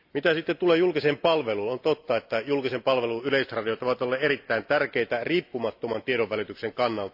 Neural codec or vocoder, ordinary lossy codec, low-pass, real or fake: none; AAC, 48 kbps; 5.4 kHz; real